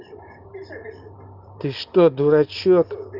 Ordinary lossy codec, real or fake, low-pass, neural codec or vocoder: Opus, 24 kbps; fake; 5.4 kHz; vocoder, 44.1 kHz, 128 mel bands every 512 samples, BigVGAN v2